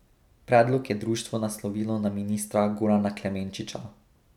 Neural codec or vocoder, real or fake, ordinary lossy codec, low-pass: none; real; none; 19.8 kHz